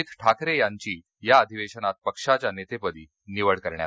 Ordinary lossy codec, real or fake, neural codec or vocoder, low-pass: none; real; none; none